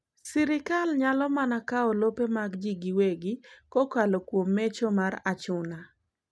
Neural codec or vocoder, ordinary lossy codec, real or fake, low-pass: none; none; real; none